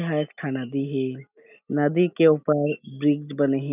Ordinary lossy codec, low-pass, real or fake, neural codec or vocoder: none; 3.6 kHz; real; none